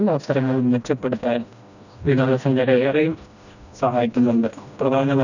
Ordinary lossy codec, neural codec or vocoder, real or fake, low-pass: none; codec, 16 kHz, 1 kbps, FreqCodec, smaller model; fake; 7.2 kHz